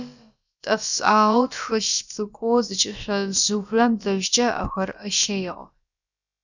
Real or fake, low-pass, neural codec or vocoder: fake; 7.2 kHz; codec, 16 kHz, about 1 kbps, DyCAST, with the encoder's durations